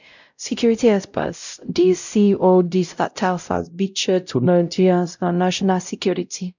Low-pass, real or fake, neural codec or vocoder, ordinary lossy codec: 7.2 kHz; fake; codec, 16 kHz, 0.5 kbps, X-Codec, WavLM features, trained on Multilingual LibriSpeech; none